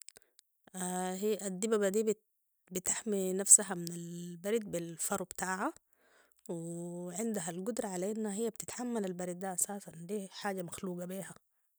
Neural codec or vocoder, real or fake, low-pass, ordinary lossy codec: none; real; none; none